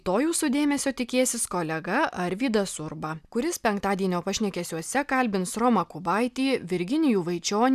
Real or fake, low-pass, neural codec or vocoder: real; 14.4 kHz; none